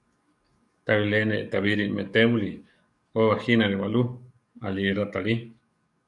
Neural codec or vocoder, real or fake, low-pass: codec, 44.1 kHz, 7.8 kbps, DAC; fake; 10.8 kHz